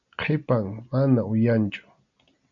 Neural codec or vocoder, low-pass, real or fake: none; 7.2 kHz; real